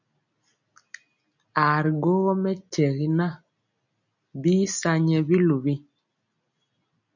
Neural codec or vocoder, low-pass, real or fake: none; 7.2 kHz; real